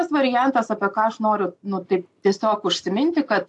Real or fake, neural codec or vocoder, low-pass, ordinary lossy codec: real; none; 10.8 kHz; AAC, 64 kbps